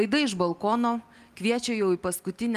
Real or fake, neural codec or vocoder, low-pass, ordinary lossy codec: real; none; 14.4 kHz; Opus, 32 kbps